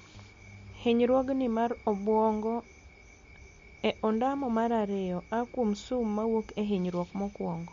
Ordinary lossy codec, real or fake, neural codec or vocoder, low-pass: MP3, 48 kbps; real; none; 7.2 kHz